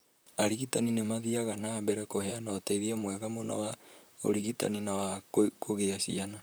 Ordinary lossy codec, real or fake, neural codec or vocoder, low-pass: none; fake; vocoder, 44.1 kHz, 128 mel bands, Pupu-Vocoder; none